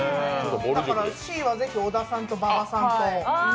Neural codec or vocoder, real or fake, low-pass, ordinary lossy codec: none; real; none; none